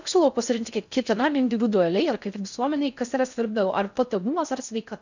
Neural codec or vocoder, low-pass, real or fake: codec, 16 kHz in and 24 kHz out, 0.6 kbps, FocalCodec, streaming, 4096 codes; 7.2 kHz; fake